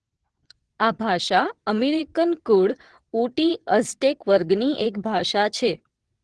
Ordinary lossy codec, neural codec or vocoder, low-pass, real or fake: Opus, 16 kbps; vocoder, 22.05 kHz, 80 mel bands, WaveNeXt; 9.9 kHz; fake